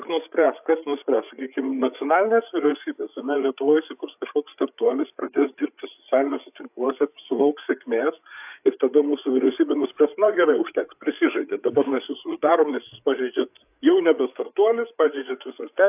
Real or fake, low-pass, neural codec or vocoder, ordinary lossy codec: fake; 3.6 kHz; codec, 16 kHz, 8 kbps, FreqCodec, larger model; AAC, 32 kbps